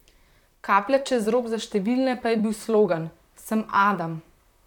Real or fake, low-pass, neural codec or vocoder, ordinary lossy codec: fake; 19.8 kHz; vocoder, 44.1 kHz, 128 mel bands, Pupu-Vocoder; none